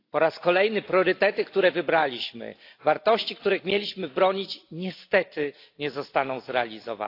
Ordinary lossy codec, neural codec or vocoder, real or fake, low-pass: AAC, 32 kbps; none; real; 5.4 kHz